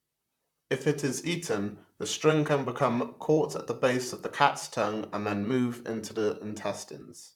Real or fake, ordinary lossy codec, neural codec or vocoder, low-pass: fake; none; vocoder, 44.1 kHz, 128 mel bands, Pupu-Vocoder; 19.8 kHz